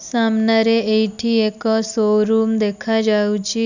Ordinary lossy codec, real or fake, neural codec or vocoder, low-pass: none; real; none; 7.2 kHz